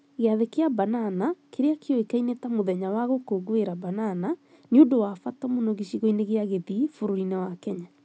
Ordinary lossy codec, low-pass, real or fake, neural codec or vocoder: none; none; real; none